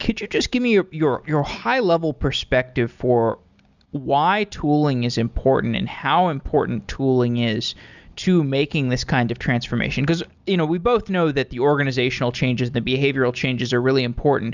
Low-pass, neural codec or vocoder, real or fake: 7.2 kHz; none; real